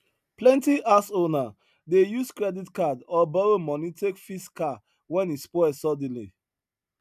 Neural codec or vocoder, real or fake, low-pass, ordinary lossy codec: none; real; 14.4 kHz; none